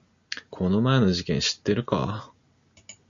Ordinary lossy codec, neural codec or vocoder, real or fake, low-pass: MP3, 96 kbps; none; real; 7.2 kHz